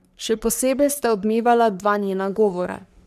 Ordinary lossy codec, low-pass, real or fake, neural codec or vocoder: none; 14.4 kHz; fake; codec, 44.1 kHz, 3.4 kbps, Pupu-Codec